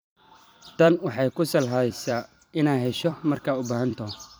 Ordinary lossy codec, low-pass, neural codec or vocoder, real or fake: none; none; vocoder, 44.1 kHz, 128 mel bands every 256 samples, BigVGAN v2; fake